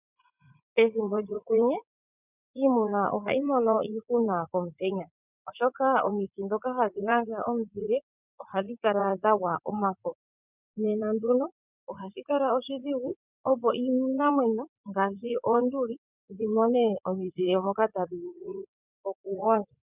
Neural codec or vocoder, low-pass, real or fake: vocoder, 44.1 kHz, 80 mel bands, Vocos; 3.6 kHz; fake